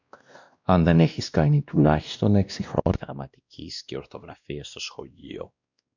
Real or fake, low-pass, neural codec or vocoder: fake; 7.2 kHz; codec, 16 kHz, 1 kbps, X-Codec, WavLM features, trained on Multilingual LibriSpeech